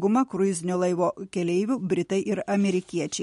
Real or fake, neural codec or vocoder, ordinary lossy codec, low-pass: real; none; MP3, 48 kbps; 10.8 kHz